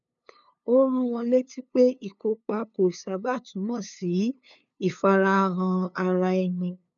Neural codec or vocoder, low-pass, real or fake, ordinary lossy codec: codec, 16 kHz, 2 kbps, FunCodec, trained on LibriTTS, 25 frames a second; 7.2 kHz; fake; none